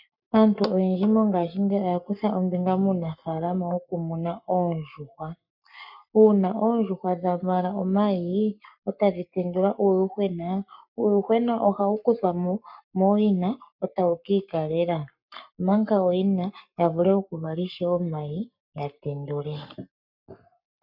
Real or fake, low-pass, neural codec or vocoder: fake; 5.4 kHz; codec, 44.1 kHz, 7.8 kbps, Pupu-Codec